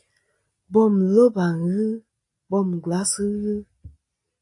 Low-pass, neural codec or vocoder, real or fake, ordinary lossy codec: 10.8 kHz; none; real; AAC, 48 kbps